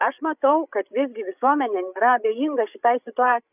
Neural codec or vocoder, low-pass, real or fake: codec, 16 kHz, 8 kbps, FreqCodec, larger model; 3.6 kHz; fake